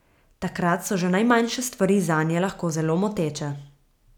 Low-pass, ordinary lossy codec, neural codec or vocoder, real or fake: 19.8 kHz; none; none; real